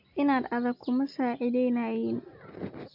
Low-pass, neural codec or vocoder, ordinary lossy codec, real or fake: 5.4 kHz; none; MP3, 48 kbps; real